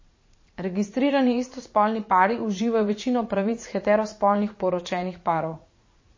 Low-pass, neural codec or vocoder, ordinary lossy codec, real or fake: 7.2 kHz; vocoder, 44.1 kHz, 80 mel bands, Vocos; MP3, 32 kbps; fake